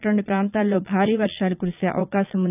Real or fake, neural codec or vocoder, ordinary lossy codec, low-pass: fake; vocoder, 22.05 kHz, 80 mel bands, Vocos; none; 3.6 kHz